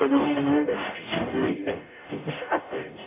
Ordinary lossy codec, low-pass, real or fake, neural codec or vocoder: AAC, 32 kbps; 3.6 kHz; fake; codec, 44.1 kHz, 0.9 kbps, DAC